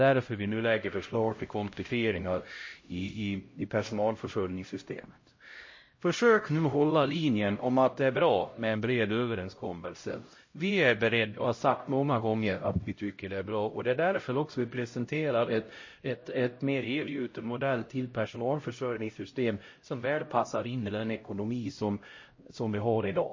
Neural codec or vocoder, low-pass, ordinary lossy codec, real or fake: codec, 16 kHz, 0.5 kbps, X-Codec, HuBERT features, trained on LibriSpeech; 7.2 kHz; MP3, 32 kbps; fake